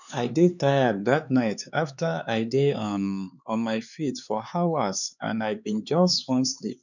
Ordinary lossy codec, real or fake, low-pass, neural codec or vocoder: none; fake; 7.2 kHz; codec, 16 kHz, 4 kbps, X-Codec, HuBERT features, trained on LibriSpeech